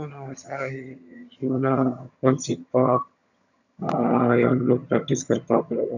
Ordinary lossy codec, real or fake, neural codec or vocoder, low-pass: none; fake; vocoder, 22.05 kHz, 80 mel bands, HiFi-GAN; 7.2 kHz